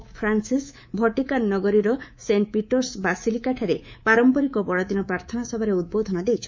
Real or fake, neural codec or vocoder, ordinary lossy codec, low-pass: fake; codec, 24 kHz, 3.1 kbps, DualCodec; MP3, 64 kbps; 7.2 kHz